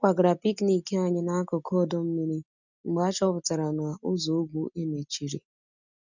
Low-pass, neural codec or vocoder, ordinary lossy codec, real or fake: 7.2 kHz; none; none; real